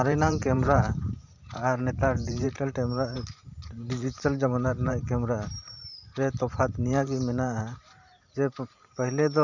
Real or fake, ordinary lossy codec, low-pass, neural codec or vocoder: fake; none; 7.2 kHz; vocoder, 44.1 kHz, 128 mel bands every 512 samples, BigVGAN v2